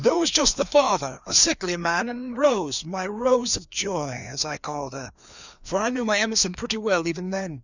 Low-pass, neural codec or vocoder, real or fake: 7.2 kHz; codec, 16 kHz, 2 kbps, FreqCodec, larger model; fake